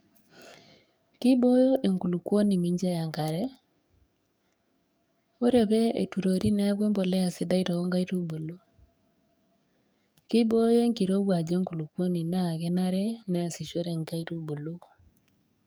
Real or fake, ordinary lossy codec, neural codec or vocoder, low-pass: fake; none; codec, 44.1 kHz, 7.8 kbps, DAC; none